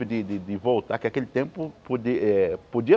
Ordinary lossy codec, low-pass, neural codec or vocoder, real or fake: none; none; none; real